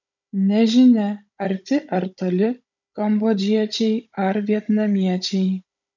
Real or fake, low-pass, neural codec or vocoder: fake; 7.2 kHz; codec, 16 kHz, 16 kbps, FunCodec, trained on Chinese and English, 50 frames a second